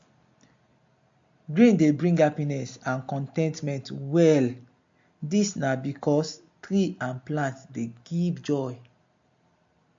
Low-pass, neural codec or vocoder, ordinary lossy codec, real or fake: 7.2 kHz; none; MP3, 48 kbps; real